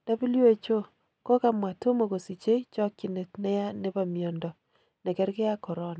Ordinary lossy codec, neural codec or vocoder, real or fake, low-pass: none; none; real; none